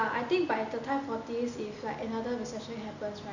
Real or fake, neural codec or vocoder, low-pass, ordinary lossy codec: real; none; 7.2 kHz; none